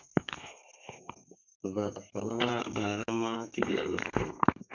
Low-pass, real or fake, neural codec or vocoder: 7.2 kHz; fake; codec, 32 kHz, 1.9 kbps, SNAC